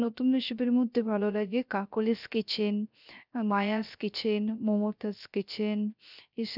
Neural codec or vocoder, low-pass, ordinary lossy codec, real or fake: codec, 16 kHz, 0.3 kbps, FocalCodec; 5.4 kHz; none; fake